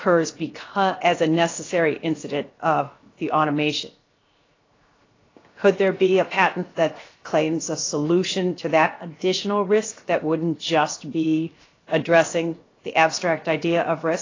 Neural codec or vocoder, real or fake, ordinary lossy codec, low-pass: codec, 16 kHz, 0.7 kbps, FocalCodec; fake; AAC, 32 kbps; 7.2 kHz